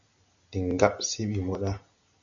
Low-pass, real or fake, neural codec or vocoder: 7.2 kHz; real; none